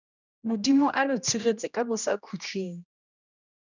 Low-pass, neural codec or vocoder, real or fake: 7.2 kHz; codec, 16 kHz, 1 kbps, X-Codec, HuBERT features, trained on general audio; fake